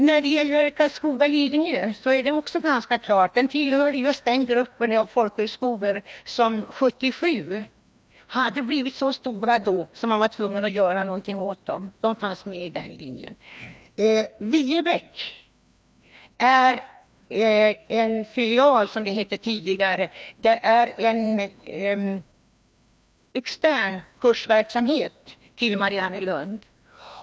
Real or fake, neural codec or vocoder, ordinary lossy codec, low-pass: fake; codec, 16 kHz, 1 kbps, FreqCodec, larger model; none; none